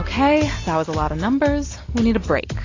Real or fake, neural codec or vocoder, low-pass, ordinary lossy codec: real; none; 7.2 kHz; AAC, 32 kbps